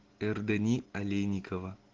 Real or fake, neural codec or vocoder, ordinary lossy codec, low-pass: real; none; Opus, 16 kbps; 7.2 kHz